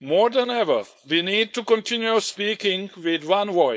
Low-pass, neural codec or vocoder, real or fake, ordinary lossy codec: none; codec, 16 kHz, 4.8 kbps, FACodec; fake; none